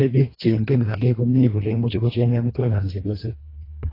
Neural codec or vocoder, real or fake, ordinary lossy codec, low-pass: codec, 24 kHz, 1.5 kbps, HILCodec; fake; AAC, 24 kbps; 5.4 kHz